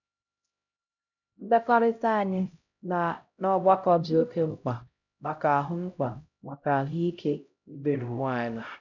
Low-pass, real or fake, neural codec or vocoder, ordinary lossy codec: 7.2 kHz; fake; codec, 16 kHz, 0.5 kbps, X-Codec, HuBERT features, trained on LibriSpeech; none